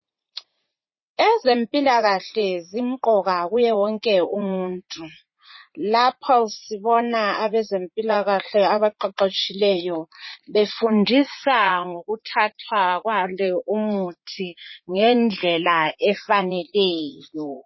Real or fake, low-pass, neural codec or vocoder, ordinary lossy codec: fake; 7.2 kHz; vocoder, 44.1 kHz, 80 mel bands, Vocos; MP3, 24 kbps